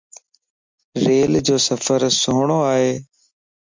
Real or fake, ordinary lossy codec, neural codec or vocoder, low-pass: real; MP3, 64 kbps; none; 7.2 kHz